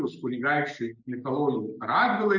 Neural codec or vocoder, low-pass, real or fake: none; 7.2 kHz; real